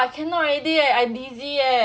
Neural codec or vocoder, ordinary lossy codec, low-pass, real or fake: none; none; none; real